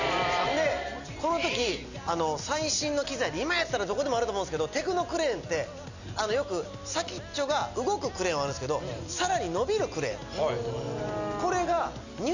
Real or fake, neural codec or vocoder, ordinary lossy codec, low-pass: real; none; none; 7.2 kHz